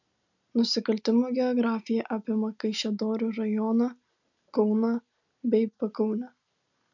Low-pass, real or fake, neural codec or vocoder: 7.2 kHz; real; none